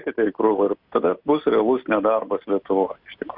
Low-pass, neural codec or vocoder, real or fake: 5.4 kHz; none; real